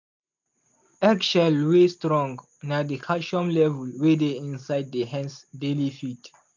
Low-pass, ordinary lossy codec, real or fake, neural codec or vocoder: 7.2 kHz; MP3, 64 kbps; real; none